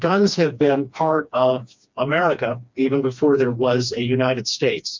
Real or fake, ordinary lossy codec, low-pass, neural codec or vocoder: fake; MP3, 64 kbps; 7.2 kHz; codec, 16 kHz, 2 kbps, FreqCodec, smaller model